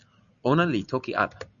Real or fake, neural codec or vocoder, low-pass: real; none; 7.2 kHz